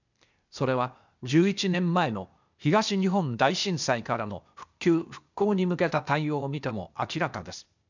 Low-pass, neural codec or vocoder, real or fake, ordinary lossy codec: 7.2 kHz; codec, 16 kHz, 0.8 kbps, ZipCodec; fake; none